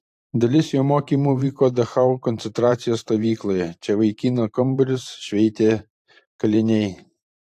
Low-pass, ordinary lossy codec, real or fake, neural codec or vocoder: 14.4 kHz; AAC, 64 kbps; fake; vocoder, 44.1 kHz, 128 mel bands every 512 samples, BigVGAN v2